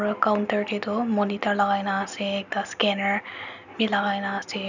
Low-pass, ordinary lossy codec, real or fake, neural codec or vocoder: 7.2 kHz; none; real; none